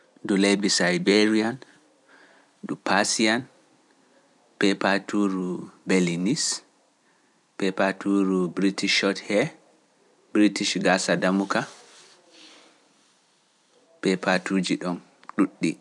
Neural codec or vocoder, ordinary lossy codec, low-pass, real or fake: vocoder, 44.1 kHz, 128 mel bands every 256 samples, BigVGAN v2; none; 10.8 kHz; fake